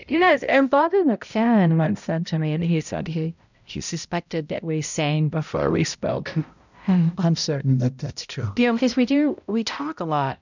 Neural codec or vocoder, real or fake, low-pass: codec, 16 kHz, 0.5 kbps, X-Codec, HuBERT features, trained on balanced general audio; fake; 7.2 kHz